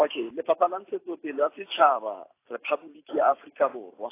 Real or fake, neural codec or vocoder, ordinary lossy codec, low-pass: fake; vocoder, 44.1 kHz, 128 mel bands every 512 samples, BigVGAN v2; AAC, 24 kbps; 3.6 kHz